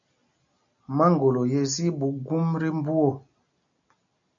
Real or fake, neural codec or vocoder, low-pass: real; none; 7.2 kHz